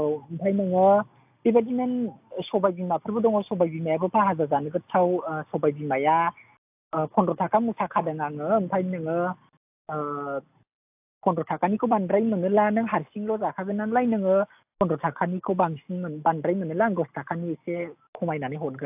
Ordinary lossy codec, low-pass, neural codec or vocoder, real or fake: AAC, 32 kbps; 3.6 kHz; none; real